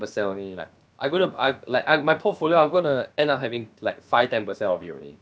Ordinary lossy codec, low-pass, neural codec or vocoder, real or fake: none; none; codec, 16 kHz, about 1 kbps, DyCAST, with the encoder's durations; fake